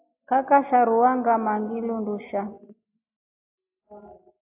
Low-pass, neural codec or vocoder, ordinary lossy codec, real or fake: 3.6 kHz; none; AAC, 32 kbps; real